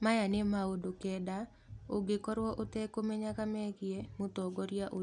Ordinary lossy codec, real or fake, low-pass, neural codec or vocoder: none; real; 10.8 kHz; none